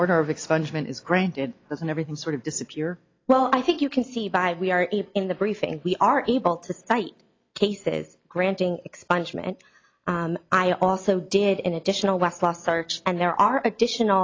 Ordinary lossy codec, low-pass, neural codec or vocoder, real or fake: AAC, 32 kbps; 7.2 kHz; none; real